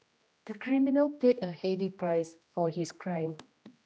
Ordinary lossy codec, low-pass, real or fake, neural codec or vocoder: none; none; fake; codec, 16 kHz, 1 kbps, X-Codec, HuBERT features, trained on general audio